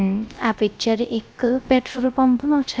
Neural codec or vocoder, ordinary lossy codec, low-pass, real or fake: codec, 16 kHz, 0.3 kbps, FocalCodec; none; none; fake